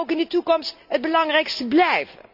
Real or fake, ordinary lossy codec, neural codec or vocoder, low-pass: real; none; none; 5.4 kHz